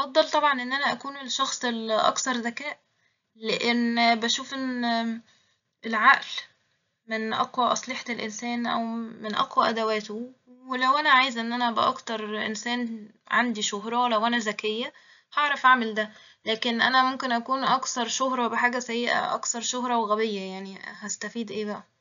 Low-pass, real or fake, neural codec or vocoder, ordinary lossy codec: 7.2 kHz; real; none; none